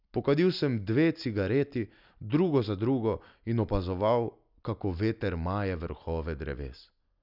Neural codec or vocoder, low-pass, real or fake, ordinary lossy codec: none; 5.4 kHz; real; none